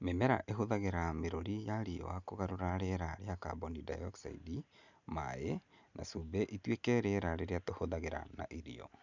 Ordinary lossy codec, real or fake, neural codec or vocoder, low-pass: none; real; none; 7.2 kHz